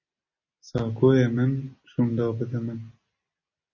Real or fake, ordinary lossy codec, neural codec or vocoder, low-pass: real; MP3, 32 kbps; none; 7.2 kHz